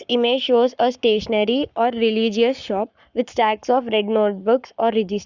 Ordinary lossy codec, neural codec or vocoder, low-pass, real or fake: Opus, 64 kbps; codec, 44.1 kHz, 7.8 kbps, Pupu-Codec; 7.2 kHz; fake